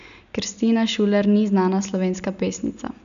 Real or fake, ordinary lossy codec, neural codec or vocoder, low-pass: real; none; none; 7.2 kHz